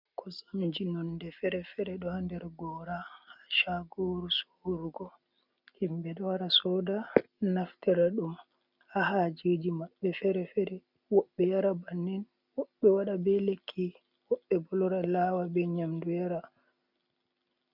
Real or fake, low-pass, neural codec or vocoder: real; 5.4 kHz; none